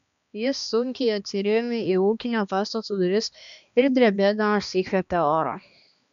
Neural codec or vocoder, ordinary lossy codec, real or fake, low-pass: codec, 16 kHz, 2 kbps, X-Codec, HuBERT features, trained on balanced general audio; MP3, 96 kbps; fake; 7.2 kHz